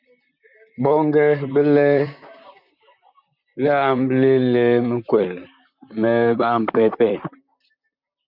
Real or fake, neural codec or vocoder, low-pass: fake; vocoder, 44.1 kHz, 128 mel bands, Pupu-Vocoder; 5.4 kHz